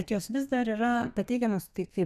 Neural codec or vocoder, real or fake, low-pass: codec, 32 kHz, 1.9 kbps, SNAC; fake; 14.4 kHz